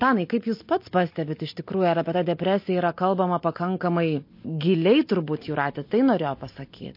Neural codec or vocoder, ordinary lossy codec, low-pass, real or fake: none; MP3, 32 kbps; 5.4 kHz; real